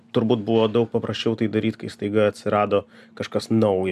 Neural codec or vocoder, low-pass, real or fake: vocoder, 44.1 kHz, 128 mel bands every 256 samples, BigVGAN v2; 14.4 kHz; fake